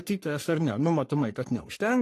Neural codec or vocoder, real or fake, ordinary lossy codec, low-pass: codec, 44.1 kHz, 3.4 kbps, Pupu-Codec; fake; AAC, 48 kbps; 14.4 kHz